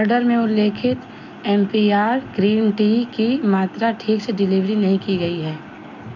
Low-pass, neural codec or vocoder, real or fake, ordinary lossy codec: 7.2 kHz; none; real; none